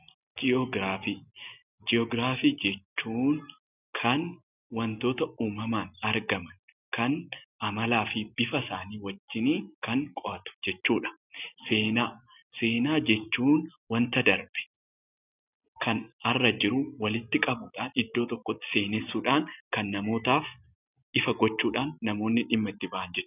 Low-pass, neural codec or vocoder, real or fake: 3.6 kHz; none; real